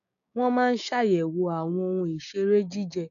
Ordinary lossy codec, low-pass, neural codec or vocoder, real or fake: none; 7.2 kHz; codec, 16 kHz, 6 kbps, DAC; fake